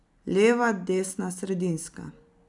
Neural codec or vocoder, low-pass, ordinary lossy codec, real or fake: none; 10.8 kHz; none; real